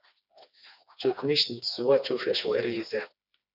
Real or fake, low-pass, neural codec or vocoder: fake; 5.4 kHz; codec, 16 kHz, 2 kbps, FreqCodec, smaller model